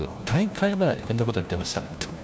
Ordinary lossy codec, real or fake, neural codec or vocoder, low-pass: none; fake; codec, 16 kHz, 1 kbps, FunCodec, trained on LibriTTS, 50 frames a second; none